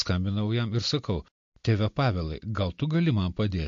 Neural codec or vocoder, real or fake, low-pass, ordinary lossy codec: none; real; 7.2 kHz; MP3, 48 kbps